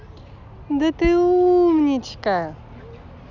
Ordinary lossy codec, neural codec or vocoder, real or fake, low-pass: none; none; real; 7.2 kHz